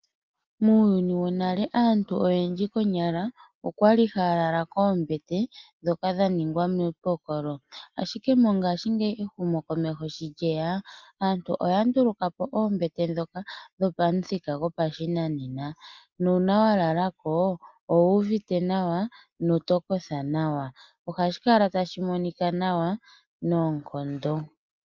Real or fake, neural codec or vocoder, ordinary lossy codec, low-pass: real; none; Opus, 32 kbps; 7.2 kHz